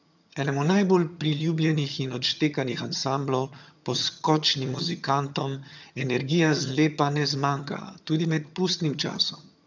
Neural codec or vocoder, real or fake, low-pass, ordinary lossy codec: vocoder, 22.05 kHz, 80 mel bands, HiFi-GAN; fake; 7.2 kHz; none